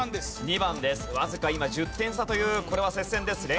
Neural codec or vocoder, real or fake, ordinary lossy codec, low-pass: none; real; none; none